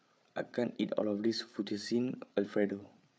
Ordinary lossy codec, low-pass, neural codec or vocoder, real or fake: none; none; codec, 16 kHz, 8 kbps, FreqCodec, larger model; fake